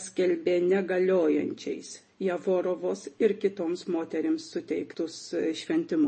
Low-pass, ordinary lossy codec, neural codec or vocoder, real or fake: 10.8 kHz; MP3, 32 kbps; none; real